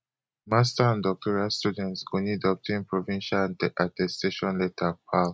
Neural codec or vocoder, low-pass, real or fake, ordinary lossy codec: none; none; real; none